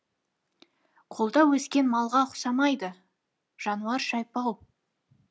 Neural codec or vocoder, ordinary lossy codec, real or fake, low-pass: none; none; real; none